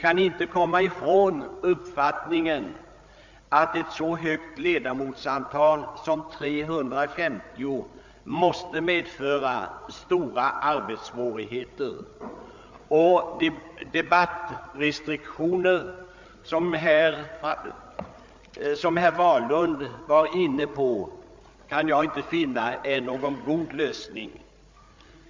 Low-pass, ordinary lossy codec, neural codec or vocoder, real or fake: 7.2 kHz; MP3, 64 kbps; codec, 16 kHz, 8 kbps, FreqCodec, larger model; fake